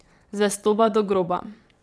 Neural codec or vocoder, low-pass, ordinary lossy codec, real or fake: vocoder, 22.05 kHz, 80 mel bands, WaveNeXt; none; none; fake